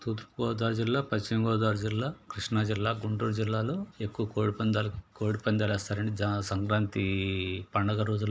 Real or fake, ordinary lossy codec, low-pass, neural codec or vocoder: real; none; none; none